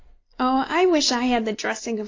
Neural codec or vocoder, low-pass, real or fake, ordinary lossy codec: none; 7.2 kHz; real; AAC, 32 kbps